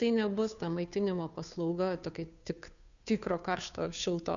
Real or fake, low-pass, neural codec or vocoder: fake; 7.2 kHz; codec, 16 kHz, 2 kbps, FunCodec, trained on Chinese and English, 25 frames a second